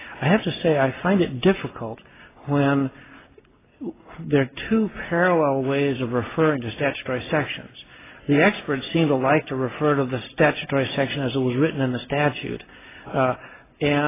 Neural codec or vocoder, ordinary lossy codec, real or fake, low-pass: none; AAC, 16 kbps; real; 3.6 kHz